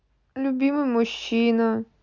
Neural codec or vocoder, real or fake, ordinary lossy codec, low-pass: none; real; none; 7.2 kHz